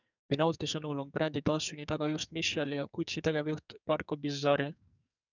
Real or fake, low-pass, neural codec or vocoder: fake; 7.2 kHz; codec, 44.1 kHz, 2.6 kbps, SNAC